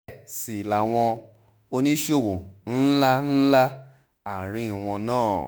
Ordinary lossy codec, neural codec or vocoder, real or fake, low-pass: none; autoencoder, 48 kHz, 32 numbers a frame, DAC-VAE, trained on Japanese speech; fake; none